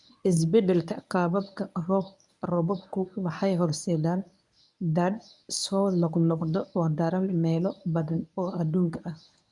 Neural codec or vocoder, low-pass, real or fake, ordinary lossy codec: codec, 24 kHz, 0.9 kbps, WavTokenizer, medium speech release version 1; 10.8 kHz; fake; none